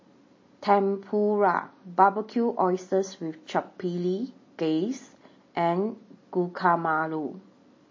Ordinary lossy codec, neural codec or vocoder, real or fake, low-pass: MP3, 32 kbps; none; real; 7.2 kHz